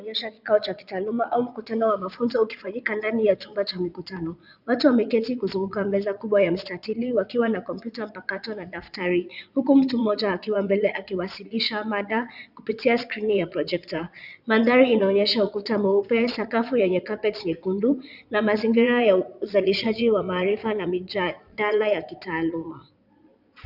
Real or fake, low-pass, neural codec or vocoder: fake; 5.4 kHz; vocoder, 22.05 kHz, 80 mel bands, Vocos